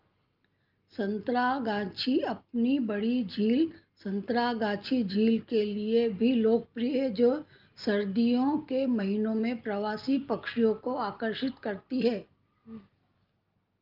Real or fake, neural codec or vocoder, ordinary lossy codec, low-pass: real; none; Opus, 24 kbps; 5.4 kHz